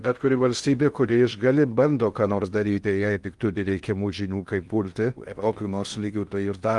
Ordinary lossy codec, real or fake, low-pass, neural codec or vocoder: Opus, 32 kbps; fake; 10.8 kHz; codec, 16 kHz in and 24 kHz out, 0.6 kbps, FocalCodec, streaming, 2048 codes